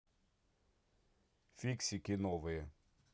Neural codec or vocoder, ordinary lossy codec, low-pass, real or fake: none; none; none; real